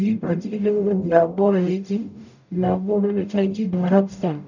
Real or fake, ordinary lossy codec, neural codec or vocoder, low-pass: fake; none; codec, 44.1 kHz, 0.9 kbps, DAC; 7.2 kHz